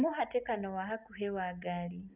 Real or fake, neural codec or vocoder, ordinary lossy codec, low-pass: real; none; none; 3.6 kHz